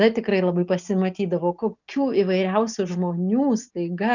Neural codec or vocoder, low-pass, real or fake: none; 7.2 kHz; real